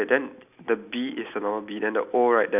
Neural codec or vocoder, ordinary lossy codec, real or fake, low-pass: none; none; real; 3.6 kHz